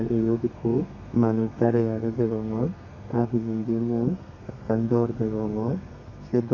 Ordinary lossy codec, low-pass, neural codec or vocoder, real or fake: none; 7.2 kHz; codec, 32 kHz, 1.9 kbps, SNAC; fake